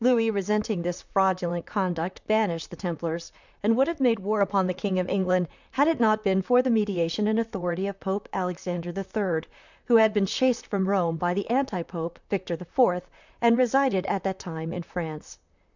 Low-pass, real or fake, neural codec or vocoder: 7.2 kHz; fake; vocoder, 44.1 kHz, 128 mel bands, Pupu-Vocoder